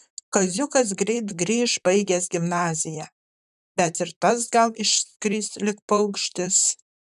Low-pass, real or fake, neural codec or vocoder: 14.4 kHz; fake; vocoder, 44.1 kHz, 128 mel bands, Pupu-Vocoder